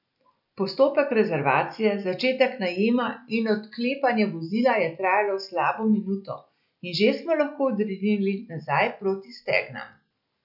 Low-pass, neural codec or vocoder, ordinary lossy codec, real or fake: 5.4 kHz; none; none; real